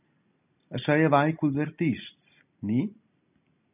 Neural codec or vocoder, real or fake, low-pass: none; real; 3.6 kHz